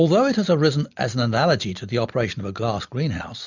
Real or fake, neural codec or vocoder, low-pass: real; none; 7.2 kHz